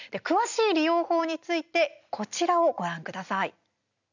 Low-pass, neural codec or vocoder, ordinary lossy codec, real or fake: 7.2 kHz; none; none; real